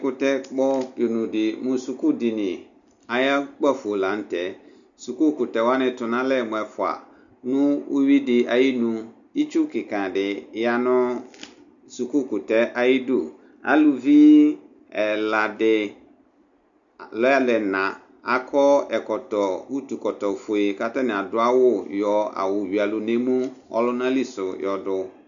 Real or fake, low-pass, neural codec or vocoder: real; 7.2 kHz; none